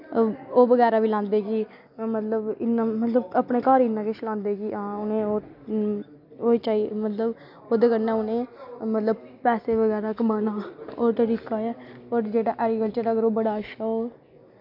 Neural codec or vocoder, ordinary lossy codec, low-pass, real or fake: none; AAC, 48 kbps; 5.4 kHz; real